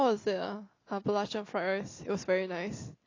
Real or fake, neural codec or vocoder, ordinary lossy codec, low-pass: real; none; AAC, 32 kbps; 7.2 kHz